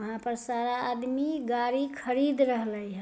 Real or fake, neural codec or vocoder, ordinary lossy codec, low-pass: real; none; none; none